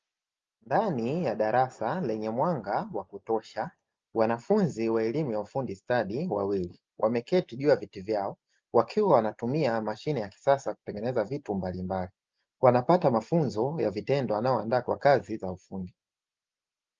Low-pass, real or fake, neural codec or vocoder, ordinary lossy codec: 7.2 kHz; real; none; Opus, 24 kbps